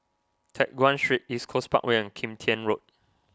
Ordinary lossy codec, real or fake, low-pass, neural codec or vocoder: none; real; none; none